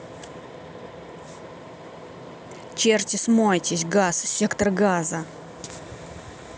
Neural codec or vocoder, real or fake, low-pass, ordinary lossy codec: none; real; none; none